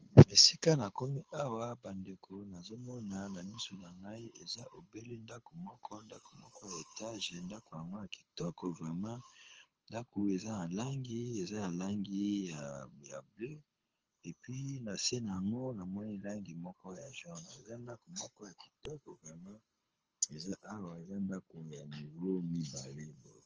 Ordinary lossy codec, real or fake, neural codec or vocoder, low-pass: Opus, 24 kbps; fake; codec, 24 kHz, 6 kbps, HILCodec; 7.2 kHz